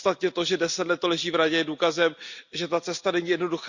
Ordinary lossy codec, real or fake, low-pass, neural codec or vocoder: Opus, 64 kbps; real; 7.2 kHz; none